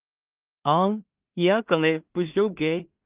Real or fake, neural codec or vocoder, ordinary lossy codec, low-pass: fake; codec, 16 kHz in and 24 kHz out, 0.4 kbps, LongCat-Audio-Codec, two codebook decoder; Opus, 32 kbps; 3.6 kHz